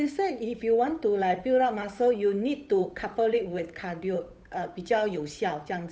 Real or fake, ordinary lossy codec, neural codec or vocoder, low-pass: fake; none; codec, 16 kHz, 8 kbps, FunCodec, trained on Chinese and English, 25 frames a second; none